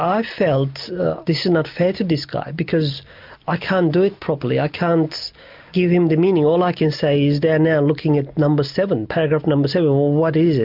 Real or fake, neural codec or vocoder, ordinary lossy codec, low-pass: real; none; MP3, 48 kbps; 5.4 kHz